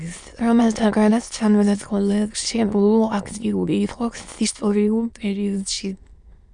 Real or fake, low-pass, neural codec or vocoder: fake; 9.9 kHz; autoencoder, 22.05 kHz, a latent of 192 numbers a frame, VITS, trained on many speakers